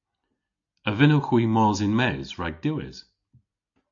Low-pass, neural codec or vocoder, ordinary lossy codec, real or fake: 7.2 kHz; none; AAC, 64 kbps; real